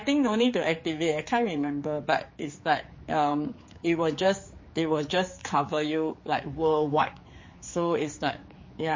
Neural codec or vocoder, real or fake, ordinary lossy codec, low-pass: codec, 16 kHz, 4 kbps, X-Codec, HuBERT features, trained on general audio; fake; MP3, 32 kbps; 7.2 kHz